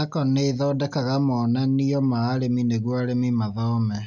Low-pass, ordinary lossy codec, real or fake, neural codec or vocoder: 7.2 kHz; none; real; none